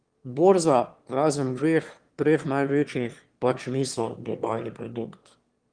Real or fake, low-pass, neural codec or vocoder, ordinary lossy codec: fake; 9.9 kHz; autoencoder, 22.05 kHz, a latent of 192 numbers a frame, VITS, trained on one speaker; Opus, 24 kbps